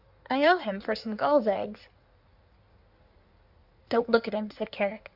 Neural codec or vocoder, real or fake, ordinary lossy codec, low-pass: codec, 16 kHz in and 24 kHz out, 1.1 kbps, FireRedTTS-2 codec; fake; AAC, 48 kbps; 5.4 kHz